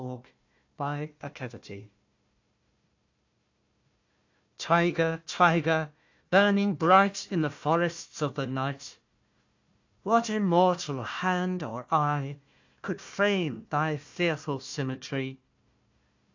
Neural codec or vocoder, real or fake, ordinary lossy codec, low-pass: codec, 16 kHz, 1 kbps, FunCodec, trained on Chinese and English, 50 frames a second; fake; AAC, 48 kbps; 7.2 kHz